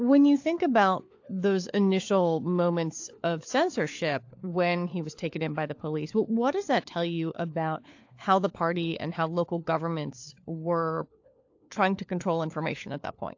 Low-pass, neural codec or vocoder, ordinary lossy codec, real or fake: 7.2 kHz; codec, 16 kHz, 4 kbps, FunCodec, trained on LibriTTS, 50 frames a second; AAC, 48 kbps; fake